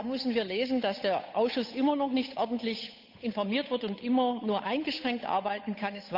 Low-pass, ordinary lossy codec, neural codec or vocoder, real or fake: 5.4 kHz; none; codec, 16 kHz, 8 kbps, FunCodec, trained on Chinese and English, 25 frames a second; fake